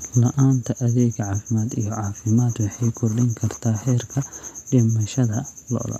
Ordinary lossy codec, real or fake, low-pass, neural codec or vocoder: none; real; 14.4 kHz; none